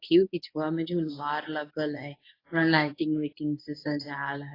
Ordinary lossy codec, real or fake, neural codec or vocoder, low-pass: AAC, 24 kbps; fake; codec, 24 kHz, 0.9 kbps, WavTokenizer, medium speech release version 1; 5.4 kHz